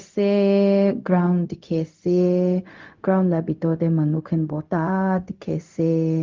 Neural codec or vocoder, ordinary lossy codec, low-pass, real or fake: codec, 16 kHz, 0.4 kbps, LongCat-Audio-Codec; Opus, 32 kbps; 7.2 kHz; fake